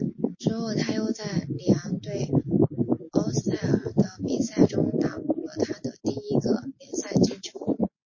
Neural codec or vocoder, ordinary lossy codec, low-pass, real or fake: none; MP3, 32 kbps; 7.2 kHz; real